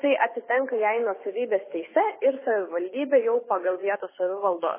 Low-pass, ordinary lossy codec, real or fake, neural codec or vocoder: 3.6 kHz; MP3, 16 kbps; fake; codec, 24 kHz, 6 kbps, HILCodec